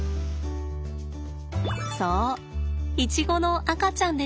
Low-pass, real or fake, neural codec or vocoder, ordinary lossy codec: none; real; none; none